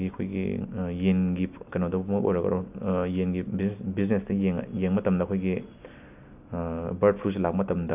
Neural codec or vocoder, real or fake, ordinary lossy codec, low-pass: none; real; AAC, 32 kbps; 3.6 kHz